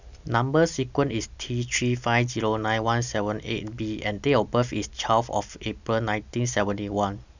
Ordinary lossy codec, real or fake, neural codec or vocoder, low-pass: none; real; none; 7.2 kHz